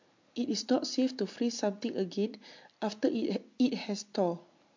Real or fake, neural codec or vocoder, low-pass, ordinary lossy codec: real; none; 7.2 kHz; MP3, 48 kbps